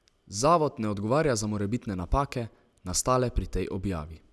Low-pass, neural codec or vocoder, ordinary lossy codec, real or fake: none; none; none; real